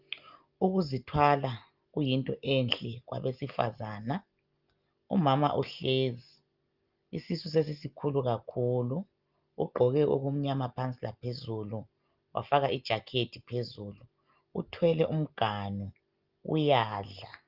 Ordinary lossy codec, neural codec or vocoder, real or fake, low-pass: Opus, 24 kbps; none; real; 5.4 kHz